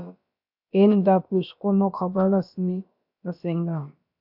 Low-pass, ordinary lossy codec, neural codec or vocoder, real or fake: 5.4 kHz; AAC, 48 kbps; codec, 16 kHz, about 1 kbps, DyCAST, with the encoder's durations; fake